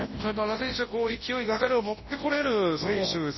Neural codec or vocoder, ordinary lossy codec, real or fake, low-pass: codec, 24 kHz, 0.9 kbps, WavTokenizer, large speech release; MP3, 24 kbps; fake; 7.2 kHz